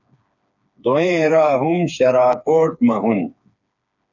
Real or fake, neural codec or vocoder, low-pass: fake; codec, 16 kHz, 4 kbps, FreqCodec, smaller model; 7.2 kHz